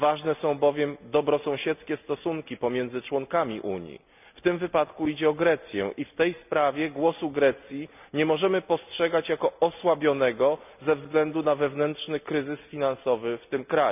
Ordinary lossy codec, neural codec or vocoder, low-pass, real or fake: none; none; 3.6 kHz; real